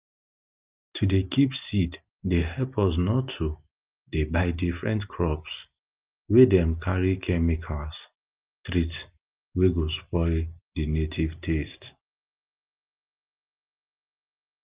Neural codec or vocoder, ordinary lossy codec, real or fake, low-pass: none; Opus, 24 kbps; real; 3.6 kHz